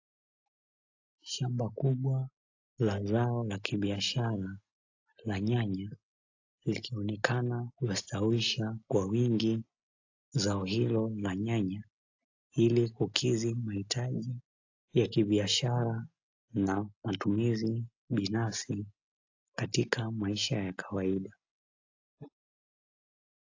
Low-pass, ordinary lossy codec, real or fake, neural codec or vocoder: 7.2 kHz; AAC, 48 kbps; real; none